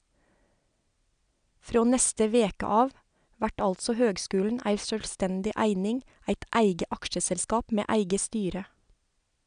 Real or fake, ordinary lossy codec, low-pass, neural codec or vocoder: real; none; 9.9 kHz; none